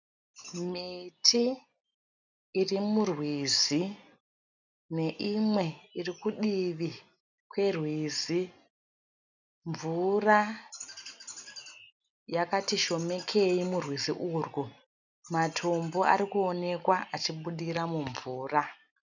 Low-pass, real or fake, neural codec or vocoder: 7.2 kHz; real; none